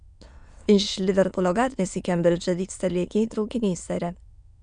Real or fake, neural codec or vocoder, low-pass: fake; autoencoder, 22.05 kHz, a latent of 192 numbers a frame, VITS, trained on many speakers; 9.9 kHz